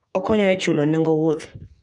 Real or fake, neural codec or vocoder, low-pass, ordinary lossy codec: fake; codec, 32 kHz, 1.9 kbps, SNAC; 10.8 kHz; none